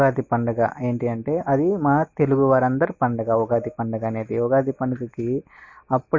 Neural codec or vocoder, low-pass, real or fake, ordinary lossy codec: none; 7.2 kHz; real; MP3, 32 kbps